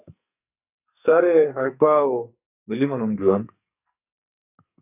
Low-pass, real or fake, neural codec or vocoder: 3.6 kHz; fake; codec, 44.1 kHz, 2.6 kbps, SNAC